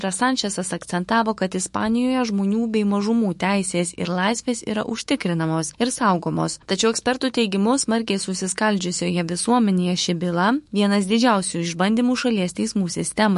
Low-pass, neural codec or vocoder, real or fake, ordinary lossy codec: 14.4 kHz; autoencoder, 48 kHz, 128 numbers a frame, DAC-VAE, trained on Japanese speech; fake; MP3, 48 kbps